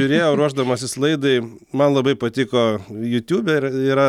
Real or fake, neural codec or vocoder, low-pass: real; none; 19.8 kHz